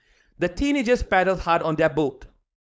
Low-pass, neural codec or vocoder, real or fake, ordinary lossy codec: none; codec, 16 kHz, 4.8 kbps, FACodec; fake; none